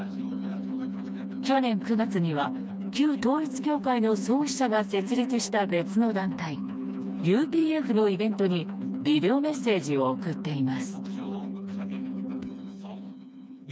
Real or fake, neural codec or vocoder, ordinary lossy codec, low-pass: fake; codec, 16 kHz, 2 kbps, FreqCodec, smaller model; none; none